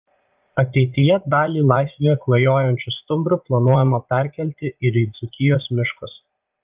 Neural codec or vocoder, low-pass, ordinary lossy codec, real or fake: vocoder, 44.1 kHz, 80 mel bands, Vocos; 3.6 kHz; Opus, 32 kbps; fake